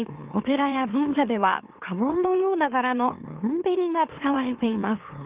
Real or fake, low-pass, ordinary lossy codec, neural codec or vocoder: fake; 3.6 kHz; Opus, 24 kbps; autoencoder, 44.1 kHz, a latent of 192 numbers a frame, MeloTTS